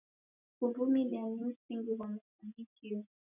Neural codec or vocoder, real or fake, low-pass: none; real; 3.6 kHz